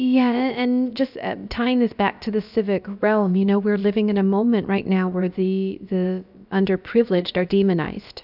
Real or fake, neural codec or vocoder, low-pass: fake; codec, 16 kHz, about 1 kbps, DyCAST, with the encoder's durations; 5.4 kHz